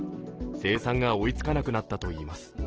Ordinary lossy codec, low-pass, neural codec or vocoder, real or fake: Opus, 16 kbps; 7.2 kHz; none; real